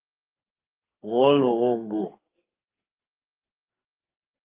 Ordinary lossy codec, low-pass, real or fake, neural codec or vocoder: Opus, 24 kbps; 3.6 kHz; fake; codec, 44.1 kHz, 2.6 kbps, SNAC